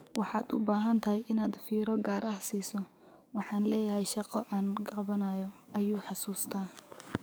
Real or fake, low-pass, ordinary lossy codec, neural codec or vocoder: fake; none; none; codec, 44.1 kHz, 7.8 kbps, DAC